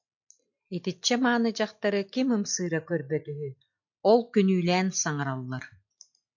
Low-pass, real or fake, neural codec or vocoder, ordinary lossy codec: 7.2 kHz; real; none; MP3, 48 kbps